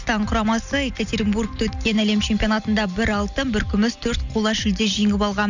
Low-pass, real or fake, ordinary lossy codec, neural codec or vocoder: 7.2 kHz; real; none; none